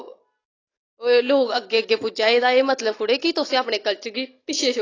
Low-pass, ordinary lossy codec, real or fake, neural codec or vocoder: 7.2 kHz; AAC, 32 kbps; real; none